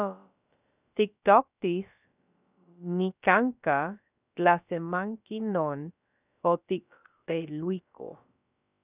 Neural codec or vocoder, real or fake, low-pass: codec, 16 kHz, about 1 kbps, DyCAST, with the encoder's durations; fake; 3.6 kHz